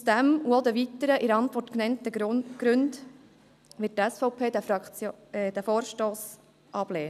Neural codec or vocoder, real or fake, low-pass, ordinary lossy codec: none; real; 14.4 kHz; none